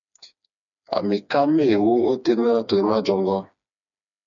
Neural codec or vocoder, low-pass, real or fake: codec, 16 kHz, 2 kbps, FreqCodec, smaller model; 7.2 kHz; fake